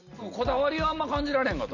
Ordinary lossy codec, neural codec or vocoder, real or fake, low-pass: none; none; real; 7.2 kHz